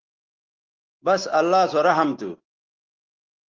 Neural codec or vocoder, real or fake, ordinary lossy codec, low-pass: none; real; Opus, 16 kbps; 7.2 kHz